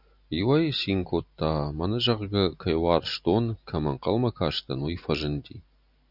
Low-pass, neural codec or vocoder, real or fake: 5.4 kHz; none; real